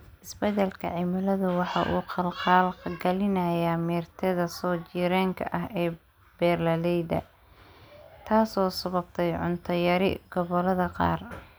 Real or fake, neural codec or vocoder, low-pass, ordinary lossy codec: real; none; none; none